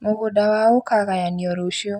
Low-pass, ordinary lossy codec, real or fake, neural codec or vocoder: 19.8 kHz; none; real; none